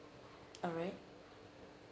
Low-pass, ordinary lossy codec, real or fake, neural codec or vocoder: none; none; real; none